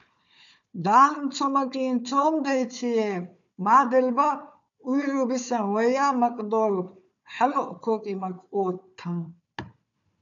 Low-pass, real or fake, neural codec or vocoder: 7.2 kHz; fake; codec, 16 kHz, 4 kbps, FunCodec, trained on Chinese and English, 50 frames a second